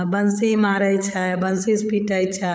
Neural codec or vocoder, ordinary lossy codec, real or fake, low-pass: codec, 16 kHz, 8 kbps, FreqCodec, larger model; none; fake; none